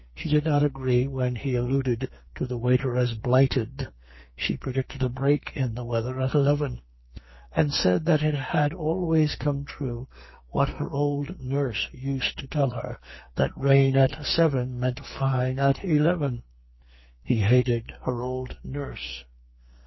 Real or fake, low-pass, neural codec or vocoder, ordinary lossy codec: fake; 7.2 kHz; codec, 44.1 kHz, 2.6 kbps, SNAC; MP3, 24 kbps